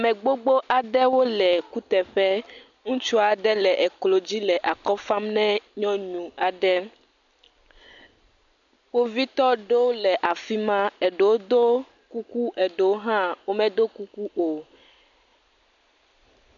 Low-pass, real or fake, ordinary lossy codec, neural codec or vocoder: 7.2 kHz; real; MP3, 96 kbps; none